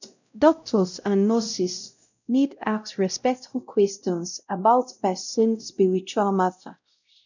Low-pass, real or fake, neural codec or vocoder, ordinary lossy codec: 7.2 kHz; fake; codec, 16 kHz, 0.5 kbps, X-Codec, WavLM features, trained on Multilingual LibriSpeech; none